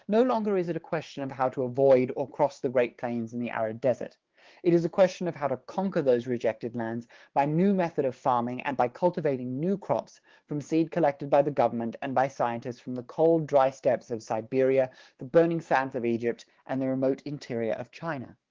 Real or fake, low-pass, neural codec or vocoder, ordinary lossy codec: fake; 7.2 kHz; codec, 16 kHz, 6 kbps, DAC; Opus, 16 kbps